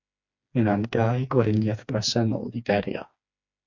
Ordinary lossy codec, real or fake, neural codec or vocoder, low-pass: MP3, 64 kbps; fake; codec, 16 kHz, 2 kbps, FreqCodec, smaller model; 7.2 kHz